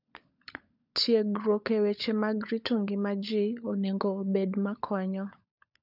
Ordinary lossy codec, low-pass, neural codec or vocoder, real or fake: none; 5.4 kHz; codec, 16 kHz, 16 kbps, FunCodec, trained on LibriTTS, 50 frames a second; fake